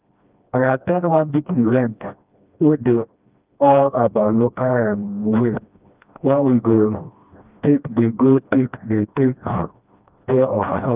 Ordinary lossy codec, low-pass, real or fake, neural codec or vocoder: Opus, 24 kbps; 3.6 kHz; fake; codec, 16 kHz, 1 kbps, FreqCodec, smaller model